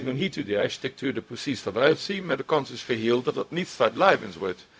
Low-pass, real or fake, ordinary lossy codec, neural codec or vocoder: none; fake; none; codec, 16 kHz, 0.4 kbps, LongCat-Audio-Codec